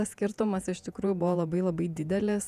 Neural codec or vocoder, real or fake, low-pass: vocoder, 48 kHz, 128 mel bands, Vocos; fake; 14.4 kHz